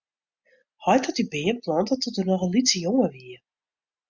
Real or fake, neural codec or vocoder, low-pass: real; none; 7.2 kHz